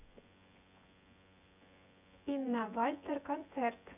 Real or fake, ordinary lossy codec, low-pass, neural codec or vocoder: fake; none; 3.6 kHz; vocoder, 24 kHz, 100 mel bands, Vocos